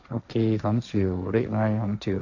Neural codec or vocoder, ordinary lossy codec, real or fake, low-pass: codec, 16 kHz, 1.1 kbps, Voila-Tokenizer; none; fake; 7.2 kHz